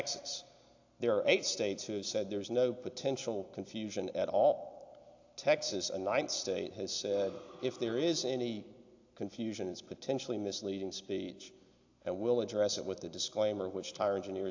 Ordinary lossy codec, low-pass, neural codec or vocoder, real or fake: AAC, 48 kbps; 7.2 kHz; none; real